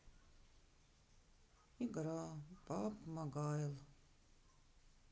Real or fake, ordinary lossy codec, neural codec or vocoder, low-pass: real; none; none; none